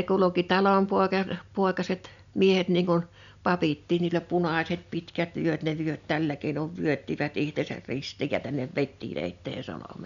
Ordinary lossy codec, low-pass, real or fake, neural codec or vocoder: none; 7.2 kHz; real; none